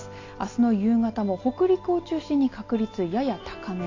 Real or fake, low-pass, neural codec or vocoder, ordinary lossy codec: real; 7.2 kHz; none; AAC, 32 kbps